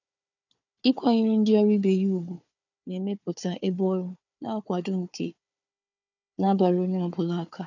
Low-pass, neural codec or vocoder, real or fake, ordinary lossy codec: 7.2 kHz; codec, 16 kHz, 4 kbps, FunCodec, trained on Chinese and English, 50 frames a second; fake; none